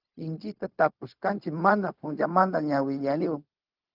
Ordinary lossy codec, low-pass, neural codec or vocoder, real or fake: Opus, 24 kbps; 5.4 kHz; codec, 16 kHz, 0.4 kbps, LongCat-Audio-Codec; fake